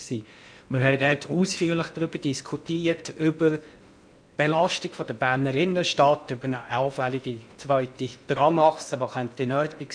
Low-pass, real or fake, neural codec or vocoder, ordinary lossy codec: 9.9 kHz; fake; codec, 16 kHz in and 24 kHz out, 0.8 kbps, FocalCodec, streaming, 65536 codes; none